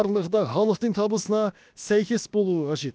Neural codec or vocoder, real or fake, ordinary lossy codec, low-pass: codec, 16 kHz, about 1 kbps, DyCAST, with the encoder's durations; fake; none; none